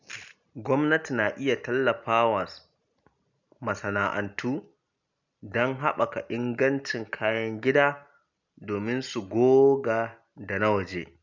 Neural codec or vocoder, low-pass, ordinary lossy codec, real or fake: none; 7.2 kHz; none; real